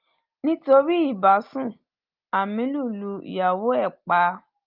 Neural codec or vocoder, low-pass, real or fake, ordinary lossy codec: none; 5.4 kHz; real; Opus, 32 kbps